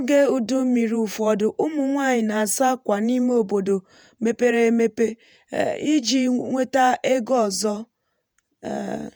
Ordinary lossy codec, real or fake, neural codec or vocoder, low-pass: none; fake; vocoder, 48 kHz, 128 mel bands, Vocos; none